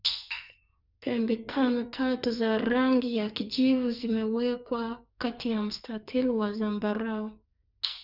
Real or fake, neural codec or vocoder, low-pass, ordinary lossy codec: fake; codec, 44.1 kHz, 2.6 kbps, SNAC; 5.4 kHz; none